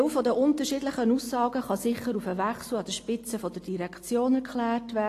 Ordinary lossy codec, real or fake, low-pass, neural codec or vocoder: AAC, 48 kbps; real; 14.4 kHz; none